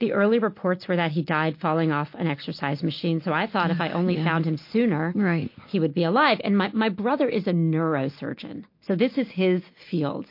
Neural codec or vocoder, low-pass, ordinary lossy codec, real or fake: none; 5.4 kHz; MP3, 32 kbps; real